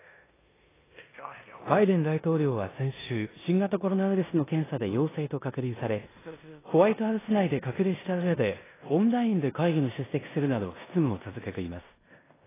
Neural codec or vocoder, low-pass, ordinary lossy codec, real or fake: codec, 16 kHz in and 24 kHz out, 0.9 kbps, LongCat-Audio-Codec, four codebook decoder; 3.6 kHz; AAC, 16 kbps; fake